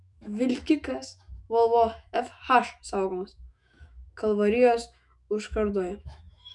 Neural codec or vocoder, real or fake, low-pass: autoencoder, 48 kHz, 128 numbers a frame, DAC-VAE, trained on Japanese speech; fake; 10.8 kHz